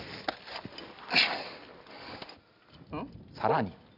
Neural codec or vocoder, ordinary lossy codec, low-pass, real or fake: none; none; 5.4 kHz; real